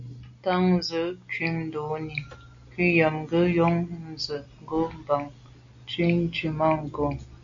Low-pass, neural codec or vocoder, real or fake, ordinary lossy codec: 7.2 kHz; none; real; MP3, 64 kbps